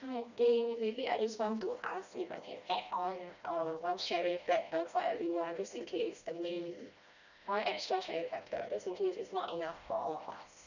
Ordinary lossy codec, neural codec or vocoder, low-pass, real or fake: none; codec, 16 kHz, 1 kbps, FreqCodec, smaller model; 7.2 kHz; fake